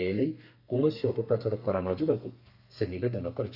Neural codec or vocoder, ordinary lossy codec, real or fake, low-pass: codec, 32 kHz, 1.9 kbps, SNAC; none; fake; 5.4 kHz